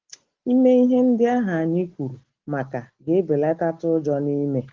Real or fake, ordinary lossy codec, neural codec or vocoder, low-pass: real; Opus, 16 kbps; none; 7.2 kHz